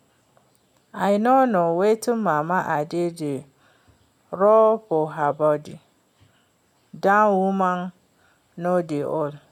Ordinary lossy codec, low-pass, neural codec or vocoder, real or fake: none; 19.8 kHz; none; real